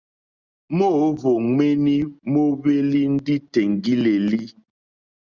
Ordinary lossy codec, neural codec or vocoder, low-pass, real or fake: Opus, 64 kbps; none; 7.2 kHz; real